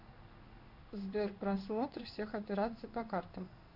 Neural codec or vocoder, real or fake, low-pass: codec, 16 kHz in and 24 kHz out, 1 kbps, XY-Tokenizer; fake; 5.4 kHz